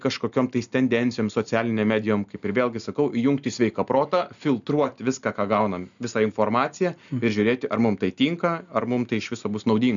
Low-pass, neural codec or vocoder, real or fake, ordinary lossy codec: 7.2 kHz; none; real; MP3, 96 kbps